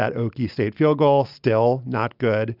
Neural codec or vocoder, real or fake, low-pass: none; real; 5.4 kHz